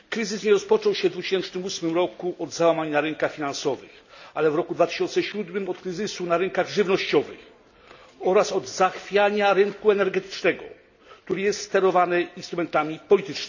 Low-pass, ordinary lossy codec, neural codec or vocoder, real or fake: 7.2 kHz; none; none; real